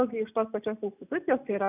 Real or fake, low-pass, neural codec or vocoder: real; 3.6 kHz; none